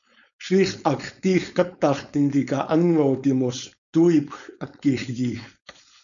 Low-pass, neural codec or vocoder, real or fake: 7.2 kHz; codec, 16 kHz, 4.8 kbps, FACodec; fake